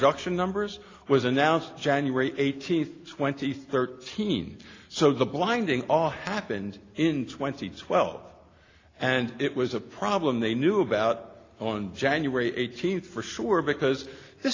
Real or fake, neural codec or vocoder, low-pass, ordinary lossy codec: real; none; 7.2 kHz; AAC, 32 kbps